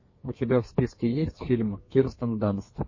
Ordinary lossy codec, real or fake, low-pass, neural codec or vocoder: MP3, 32 kbps; fake; 7.2 kHz; codec, 32 kHz, 1.9 kbps, SNAC